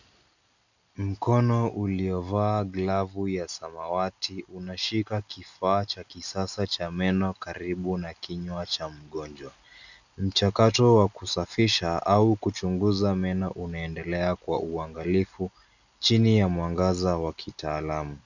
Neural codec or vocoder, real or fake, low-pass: none; real; 7.2 kHz